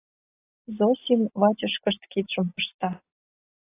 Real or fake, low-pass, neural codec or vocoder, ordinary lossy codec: real; 3.6 kHz; none; AAC, 24 kbps